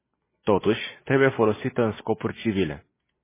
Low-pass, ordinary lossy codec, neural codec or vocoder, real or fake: 3.6 kHz; MP3, 16 kbps; none; real